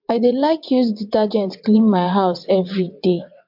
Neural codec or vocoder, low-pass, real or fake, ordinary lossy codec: vocoder, 22.05 kHz, 80 mel bands, WaveNeXt; 5.4 kHz; fake; MP3, 48 kbps